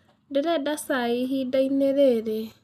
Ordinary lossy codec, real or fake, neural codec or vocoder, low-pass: none; real; none; 14.4 kHz